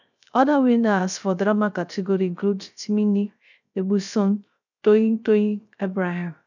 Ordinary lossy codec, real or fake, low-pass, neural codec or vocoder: none; fake; 7.2 kHz; codec, 16 kHz, 0.3 kbps, FocalCodec